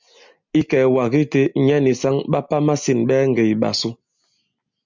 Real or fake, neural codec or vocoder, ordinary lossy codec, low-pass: real; none; MP3, 64 kbps; 7.2 kHz